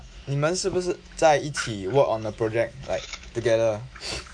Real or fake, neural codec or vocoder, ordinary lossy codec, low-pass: fake; autoencoder, 48 kHz, 128 numbers a frame, DAC-VAE, trained on Japanese speech; none; 9.9 kHz